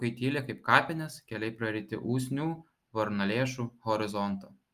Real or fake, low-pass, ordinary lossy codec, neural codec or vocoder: real; 14.4 kHz; Opus, 32 kbps; none